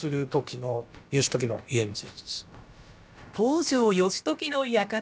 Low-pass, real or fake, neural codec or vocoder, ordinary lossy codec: none; fake; codec, 16 kHz, about 1 kbps, DyCAST, with the encoder's durations; none